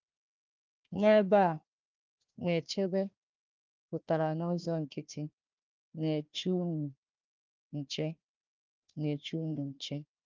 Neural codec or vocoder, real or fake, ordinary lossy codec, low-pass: codec, 16 kHz, 1 kbps, FunCodec, trained on Chinese and English, 50 frames a second; fake; Opus, 24 kbps; 7.2 kHz